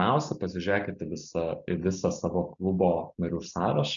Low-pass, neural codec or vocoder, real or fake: 7.2 kHz; none; real